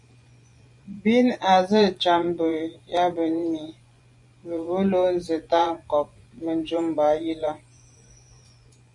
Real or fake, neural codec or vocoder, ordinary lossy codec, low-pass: fake; vocoder, 24 kHz, 100 mel bands, Vocos; MP3, 96 kbps; 10.8 kHz